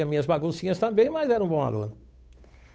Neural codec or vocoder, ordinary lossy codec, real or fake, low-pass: codec, 16 kHz, 8 kbps, FunCodec, trained on Chinese and English, 25 frames a second; none; fake; none